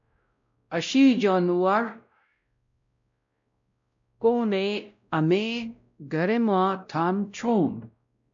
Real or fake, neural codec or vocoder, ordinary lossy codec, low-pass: fake; codec, 16 kHz, 0.5 kbps, X-Codec, WavLM features, trained on Multilingual LibriSpeech; MP3, 64 kbps; 7.2 kHz